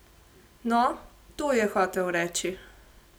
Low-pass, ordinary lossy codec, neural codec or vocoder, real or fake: none; none; none; real